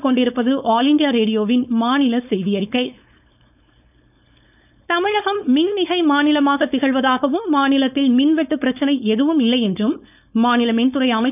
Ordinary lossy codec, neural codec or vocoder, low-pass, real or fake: none; codec, 16 kHz, 4.8 kbps, FACodec; 3.6 kHz; fake